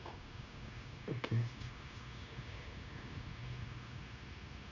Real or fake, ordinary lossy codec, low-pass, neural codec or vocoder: fake; none; 7.2 kHz; codec, 16 kHz, 0.9 kbps, LongCat-Audio-Codec